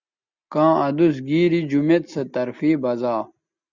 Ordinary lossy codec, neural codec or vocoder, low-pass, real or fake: Opus, 64 kbps; none; 7.2 kHz; real